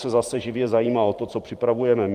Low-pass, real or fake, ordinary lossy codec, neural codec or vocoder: 14.4 kHz; fake; Opus, 24 kbps; vocoder, 44.1 kHz, 128 mel bands every 512 samples, BigVGAN v2